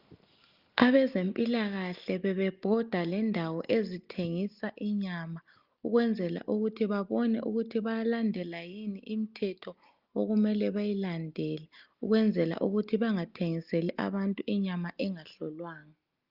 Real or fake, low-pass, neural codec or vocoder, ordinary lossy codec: real; 5.4 kHz; none; Opus, 32 kbps